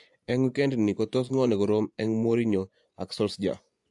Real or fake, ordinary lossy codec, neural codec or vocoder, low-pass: fake; AAC, 64 kbps; vocoder, 24 kHz, 100 mel bands, Vocos; 10.8 kHz